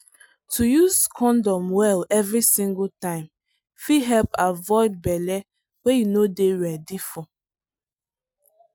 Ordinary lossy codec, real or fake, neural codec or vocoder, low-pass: none; real; none; none